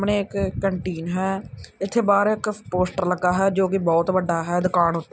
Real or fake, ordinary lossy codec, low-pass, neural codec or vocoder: real; none; none; none